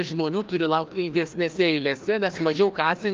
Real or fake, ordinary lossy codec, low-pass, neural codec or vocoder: fake; Opus, 24 kbps; 7.2 kHz; codec, 16 kHz, 1 kbps, FreqCodec, larger model